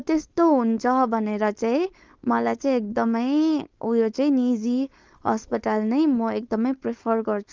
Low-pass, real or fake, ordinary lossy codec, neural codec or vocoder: 7.2 kHz; fake; Opus, 32 kbps; codec, 16 kHz, 4.8 kbps, FACodec